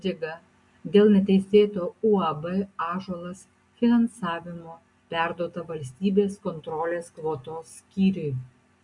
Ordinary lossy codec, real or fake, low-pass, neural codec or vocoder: MP3, 64 kbps; real; 10.8 kHz; none